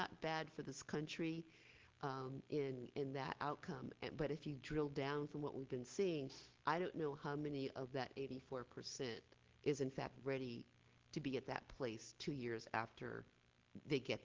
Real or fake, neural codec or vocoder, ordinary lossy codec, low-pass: real; none; Opus, 16 kbps; 7.2 kHz